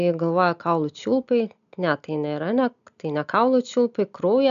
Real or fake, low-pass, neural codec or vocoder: real; 7.2 kHz; none